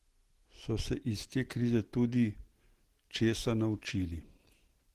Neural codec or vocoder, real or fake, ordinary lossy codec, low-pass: none; real; Opus, 16 kbps; 14.4 kHz